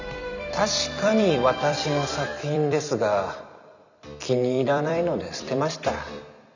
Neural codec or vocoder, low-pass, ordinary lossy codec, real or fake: vocoder, 44.1 kHz, 128 mel bands every 256 samples, BigVGAN v2; 7.2 kHz; none; fake